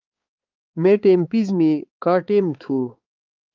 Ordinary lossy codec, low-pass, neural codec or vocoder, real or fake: Opus, 32 kbps; 7.2 kHz; codec, 16 kHz, 4 kbps, X-Codec, HuBERT features, trained on balanced general audio; fake